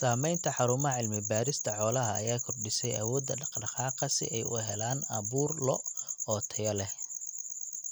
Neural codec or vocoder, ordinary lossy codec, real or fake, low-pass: none; none; real; none